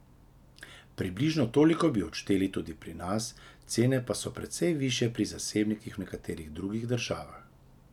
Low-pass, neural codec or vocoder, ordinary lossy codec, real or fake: 19.8 kHz; none; none; real